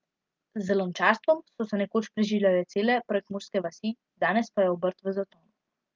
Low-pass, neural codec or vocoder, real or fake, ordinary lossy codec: 7.2 kHz; none; real; Opus, 24 kbps